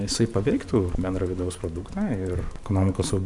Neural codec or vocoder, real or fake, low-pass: vocoder, 44.1 kHz, 128 mel bands every 512 samples, BigVGAN v2; fake; 10.8 kHz